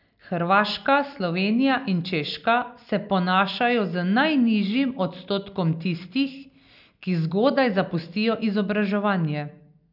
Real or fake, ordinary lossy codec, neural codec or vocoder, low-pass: real; none; none; 5.4 kHz